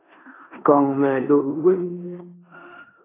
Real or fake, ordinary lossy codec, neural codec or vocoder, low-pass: fake; AAC, 16 kbps; codec, 16 kHz in and 24 kHz out, 0.9 kbps, LongCat-Audio-Codec, four codebook decoder; 3.6 kHz